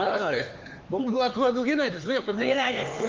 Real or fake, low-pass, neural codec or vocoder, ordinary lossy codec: fake; 7.2 kHz; codec, 16 kHz, 4 kbps, X-Codec, HuBERT features, trained on LibriSpeech; Opus, 32 kbps